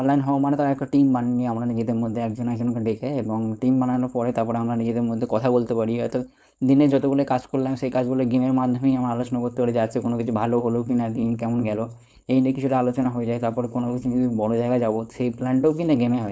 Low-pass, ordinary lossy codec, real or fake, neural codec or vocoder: none; none; fake; codec, 16 kHz, 4.8 kbps, FACodec